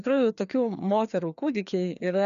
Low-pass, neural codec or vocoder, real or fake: 7.2 kHz; codec, 16 kHz, 2 kbps, FreqCodec, larger model; fake